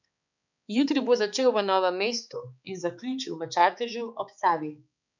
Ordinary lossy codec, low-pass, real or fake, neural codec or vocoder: none; 7.2 kHz; fake; codec, 16 kHz, 4 kbps, X-Codec, HuBERT features, trained on balanced general audio